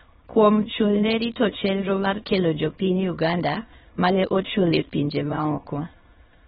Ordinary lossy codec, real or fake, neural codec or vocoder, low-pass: AAC, 16 kbps; fake; autoencoder, 22.05 kHz, a latent of 192 numbers a frame, VITS, trained on many speakers; 9.9 kHz